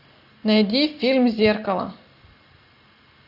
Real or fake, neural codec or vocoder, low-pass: real; none; 5.4 kHz